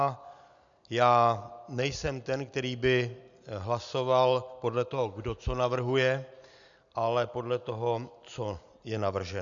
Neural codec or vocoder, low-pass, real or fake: none; 7.2 kHz; real